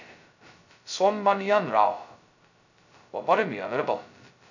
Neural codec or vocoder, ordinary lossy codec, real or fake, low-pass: codec, 16 kHz, 0.2 kbps, FocalCodec; none; fake; 7.2 kHz